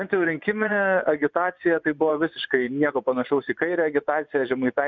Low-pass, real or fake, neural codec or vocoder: 7.2 kHz; fake; vocoder, 24 kHz, 100 mel bands, Vocos